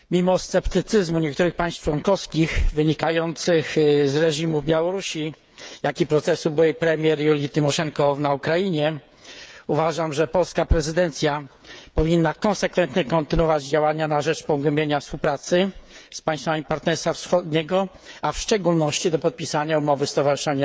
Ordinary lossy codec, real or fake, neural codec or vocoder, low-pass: none; fake; codec, 16 kHz, 8 kbps, FreqCodec, smaller model; none